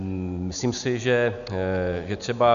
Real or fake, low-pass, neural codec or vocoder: real; 7.2 kHz; none